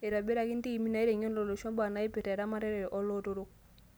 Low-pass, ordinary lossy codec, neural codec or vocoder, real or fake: none; none; none; real